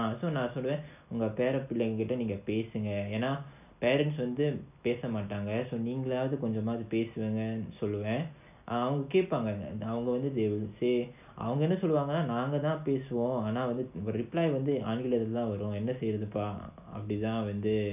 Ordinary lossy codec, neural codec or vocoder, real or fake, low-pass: none; none; real; 3.6 kHz